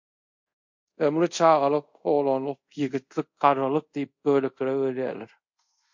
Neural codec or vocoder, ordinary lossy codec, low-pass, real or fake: codec, 24 kHz, 0.5 kbps, DualCodec; MP3, 48 kbps; 7.2 kHz; fake